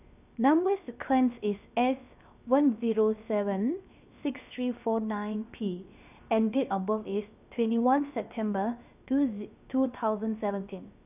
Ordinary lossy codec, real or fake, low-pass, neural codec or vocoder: none; fake; 3.6 kHz; codec, 16 kHz, 0.7 kbps, FocalCodec